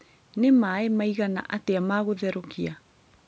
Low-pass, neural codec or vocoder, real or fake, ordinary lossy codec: none; none; real; none